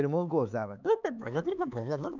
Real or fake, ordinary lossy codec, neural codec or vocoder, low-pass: fake; none; codec, 16 kHz, 4 kbps, X-Codec, HuBERT features, trained on LibriSpeech; 7.2 kHz